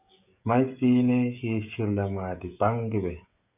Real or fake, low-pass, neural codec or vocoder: fake; 3.6 kHz; codec, 16 kHz, 16 kbps, FreqCodec, smaller model